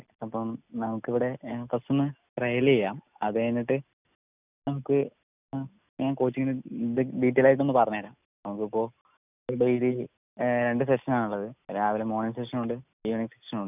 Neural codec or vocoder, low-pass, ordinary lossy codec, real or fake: none; 3.6 kHz; none; real